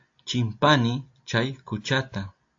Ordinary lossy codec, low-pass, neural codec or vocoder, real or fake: AAC, 48 kbps; 7.2 kHz; none; real